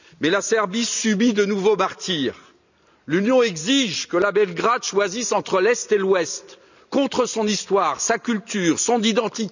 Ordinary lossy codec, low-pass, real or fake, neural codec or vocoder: none; 7.2 kHz; real; none